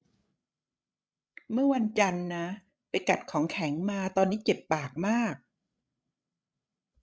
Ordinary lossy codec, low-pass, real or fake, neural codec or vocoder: none; none; fake; codec, 16 kHz, 16 kbps, FreqCodec, larger model